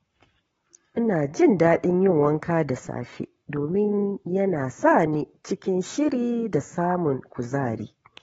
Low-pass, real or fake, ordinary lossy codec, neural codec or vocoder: 19.8 kHz; fake; AAC, 24 kbps; codec, 44.1 kHz, 7.8 kbps, Pupu-Codec